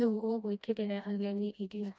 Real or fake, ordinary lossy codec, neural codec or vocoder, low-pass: fake; none; codec, 16 kHz, 1 kbps, FreqCodec, smaller model; none